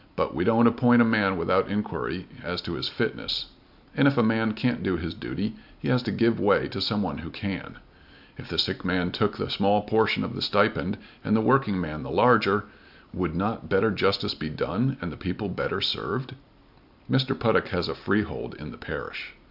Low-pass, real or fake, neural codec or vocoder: 5.4 kHz; real; none